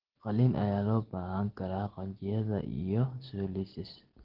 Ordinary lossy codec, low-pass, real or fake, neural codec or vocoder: Opus, 16 kbps; 5.4 kHz; real; none